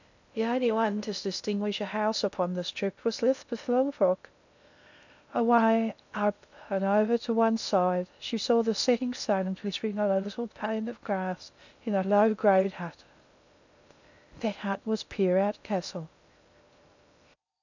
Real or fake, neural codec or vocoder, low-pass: fake; codec, 16 kHz in and 24 kHz out, 0.6 kbps, FocalCodec, streaming, 2048 codes; 7.2 kHz